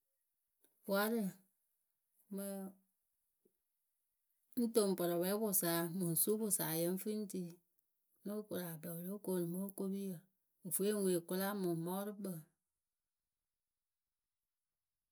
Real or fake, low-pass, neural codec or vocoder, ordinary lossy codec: real; none; none; none